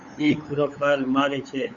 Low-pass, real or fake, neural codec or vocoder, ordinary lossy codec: 7.2 kHz; fake; codec, 16 kHz, 8 kbps, FunCodec, trained on LibriTTS, 25 frames a second; Opus, 64 kbps